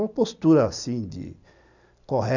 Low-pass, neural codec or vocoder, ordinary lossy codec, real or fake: 7.2 kHz; none; none; real